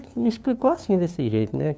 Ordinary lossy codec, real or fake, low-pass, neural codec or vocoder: none; fake; none; codec, 16 kHz, 2 kbps, FunCodec, trained on LibriTTS, 25 frames a second